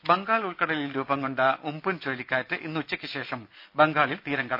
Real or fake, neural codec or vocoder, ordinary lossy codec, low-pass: real; none; none; 5.4 kHz